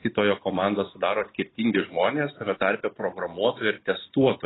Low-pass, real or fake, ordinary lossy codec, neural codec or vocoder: 7.2 kHz; real; AAC, 16 kbps; none